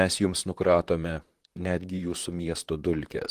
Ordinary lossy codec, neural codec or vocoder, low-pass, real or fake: Opus, 24 kbps; vocoder, 44.1 kHz, 128 mel bands, Pupu-Vocoder; 14.4 kHz; fake